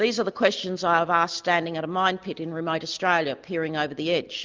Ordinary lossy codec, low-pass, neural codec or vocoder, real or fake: Opus, 32 kbps; 7.2 kHz; none; real